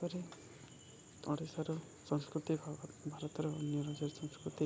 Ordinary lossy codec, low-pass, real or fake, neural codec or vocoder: none; none; real; none